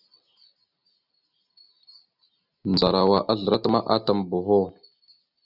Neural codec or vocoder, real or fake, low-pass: none; real; 5.4 kHz